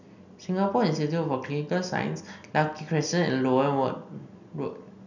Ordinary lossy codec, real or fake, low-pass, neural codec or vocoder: none; real; 7.2 kHz; none